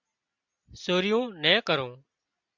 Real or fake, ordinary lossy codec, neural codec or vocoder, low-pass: real; Opus, 64 kbps; none; 7.2 kHz